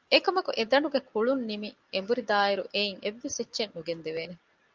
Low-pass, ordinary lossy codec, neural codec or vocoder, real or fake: 7.2 kHz; Opus, 24 kbps; none; real